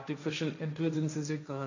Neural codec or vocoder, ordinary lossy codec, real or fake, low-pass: codec, 16 kHz, 1.1 kbps, Voila-Tokenizer; none; fake; 7.2 kHz